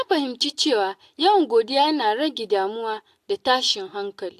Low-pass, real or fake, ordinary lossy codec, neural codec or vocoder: 14.4 kHz; real; AAC, 64 kbps; none